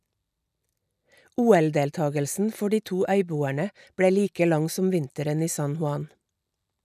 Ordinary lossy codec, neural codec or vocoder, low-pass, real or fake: none; none; 14.4 kHz; real